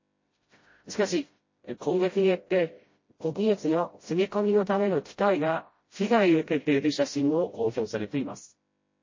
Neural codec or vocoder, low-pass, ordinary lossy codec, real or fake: codec, 16 kHz, 0.5 kbps, FreqCodec, smaller model; 7.2 kHz; MP3, 32 kbps; fake